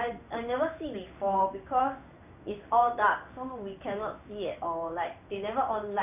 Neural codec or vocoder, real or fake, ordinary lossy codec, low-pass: codec, 16 kHz in and 24 kHz out, 1 kbps, XY-Tokenizer; fake; none; 3.6 kHz